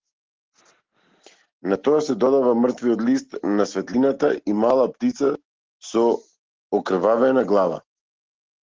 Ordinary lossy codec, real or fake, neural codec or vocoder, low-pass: Opus, 16 kbps; real; none; 7.2 kHz